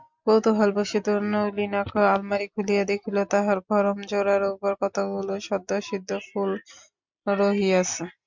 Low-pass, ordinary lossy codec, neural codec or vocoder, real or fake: 7.2 kHz; MP3, 64 kbps; none; real